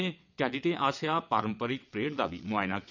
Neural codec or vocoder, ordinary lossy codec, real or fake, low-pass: vocoder, 22.05 kHz, 80 mel bands, WaveNeXt; none; fake; 7.2 kHz